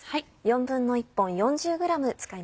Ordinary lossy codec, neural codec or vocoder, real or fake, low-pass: none; none; real; none